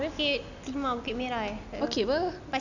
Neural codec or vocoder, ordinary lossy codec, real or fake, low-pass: none; none; real; 7.2 kHz